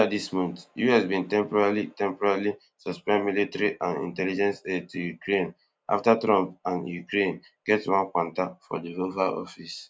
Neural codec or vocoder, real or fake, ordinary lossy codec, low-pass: none; real; none; none